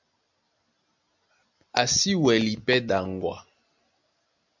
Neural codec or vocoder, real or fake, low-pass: none; real; 7.2 kHz